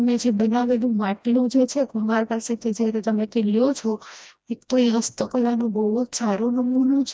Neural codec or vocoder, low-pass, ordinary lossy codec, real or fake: codec, 16 kHz, 1 kbps, FreqCodec, smaller model; none; none; fake